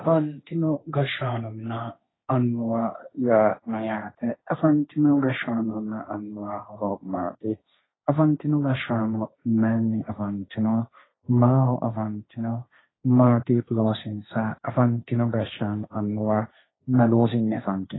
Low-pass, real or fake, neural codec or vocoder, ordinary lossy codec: 7.2 kHz; fake; codec, 16 kHz, 1.1 kbps, Voila-Tokenizer; AAC, 16 kbps